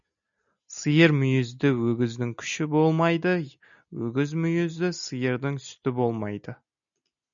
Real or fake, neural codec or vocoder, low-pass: real; none; 7.2 kHz